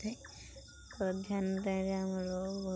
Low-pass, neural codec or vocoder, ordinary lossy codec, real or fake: none; none; none; real